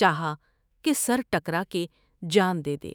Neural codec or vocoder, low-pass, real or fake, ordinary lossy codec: autoencoder, 48 kHz, 128 numbers a frame, DAC-VAE, trained on Japanese speech; none; fake; none